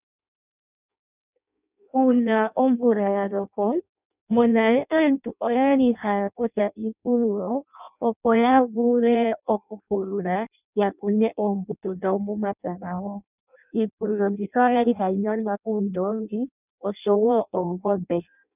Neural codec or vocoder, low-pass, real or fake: codec, 16 kHz in and 24 kHz out, 0.6 kbps, FireRedTTS-2 codec; 3.6 kHz; fake